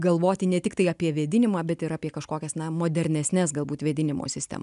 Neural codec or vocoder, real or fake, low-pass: none; real; 10.8 kHz